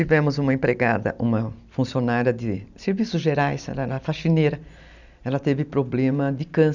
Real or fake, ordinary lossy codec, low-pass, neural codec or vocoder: real; none; 7.2 kHz; none